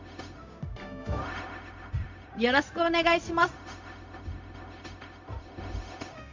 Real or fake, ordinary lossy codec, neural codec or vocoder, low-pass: fake; MP3, 48 kbps; codec, 16 kHz, 0.4 kbps, LongCat-Audio-Codec; 7.2 kHz